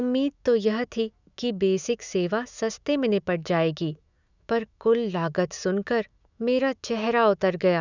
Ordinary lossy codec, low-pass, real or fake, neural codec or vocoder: none; 7.2 kHz; fake; autoencoder, 48 kHz, 128 numbers a frame, DAC-VAE, trained on Japanese speech